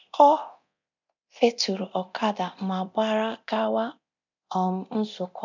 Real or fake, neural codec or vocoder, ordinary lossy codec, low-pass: fake; codec, 24 kHz, 0.9 kbps, DualCodec; none; 7.2 kHz